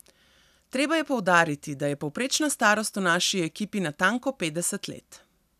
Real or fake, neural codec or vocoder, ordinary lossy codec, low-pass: real; none; none; 14.4 kHz